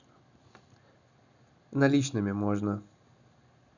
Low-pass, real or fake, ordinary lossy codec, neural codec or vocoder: 7.2 kHz; real; none; none